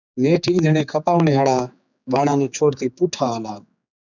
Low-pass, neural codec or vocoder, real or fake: 7.2 kHz; codec, 16 kHz, 4 kbps, X-Codec, HuBERT features, trained on general audio; fake